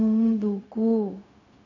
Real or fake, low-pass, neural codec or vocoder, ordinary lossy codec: fake; 7.2 kHz; codec, 16 kHz, 0.4 kbps, LongCat-Audio-Codec; none